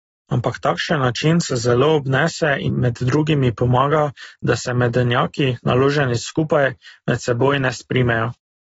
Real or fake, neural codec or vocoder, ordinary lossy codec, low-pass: real; none; AAC, 24 kbps; 9.9 kHz